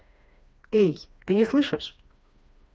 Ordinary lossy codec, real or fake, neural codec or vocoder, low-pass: none; fake; codec, 16 kHz, 2 kbps, FreqCodec, smaller model; none